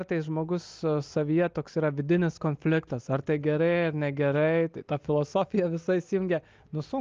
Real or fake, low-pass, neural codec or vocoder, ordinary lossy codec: real; 7.2 kHz; none; Opus, 24 kbps